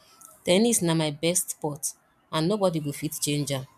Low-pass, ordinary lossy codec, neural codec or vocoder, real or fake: 14.4 kHz; none; none; real